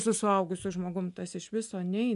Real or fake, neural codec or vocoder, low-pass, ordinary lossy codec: real; none; 10.8 kHz; AAC, 96 kbps